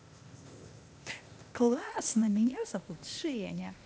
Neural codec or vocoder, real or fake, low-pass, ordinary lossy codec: codec, 16 kHz, 0.8 kbps, ZipCodec; fake; none; none